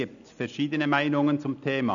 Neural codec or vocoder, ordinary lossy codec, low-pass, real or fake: none; none; 7.2 kHz; real